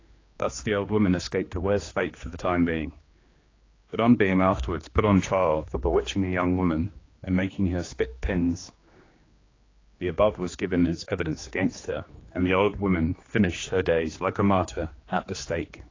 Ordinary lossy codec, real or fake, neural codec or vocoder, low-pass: AAC, 32 kbps; fake; codec, 16 kHz, 2 kbps, X-Codec, HuBERT features, trained on general audio; 7.2 kHz